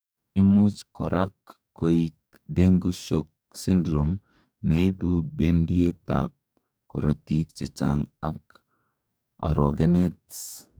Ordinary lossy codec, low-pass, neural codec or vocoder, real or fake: none; none; codec, 44.1 kHz, 2.6 kbps, DAC; fake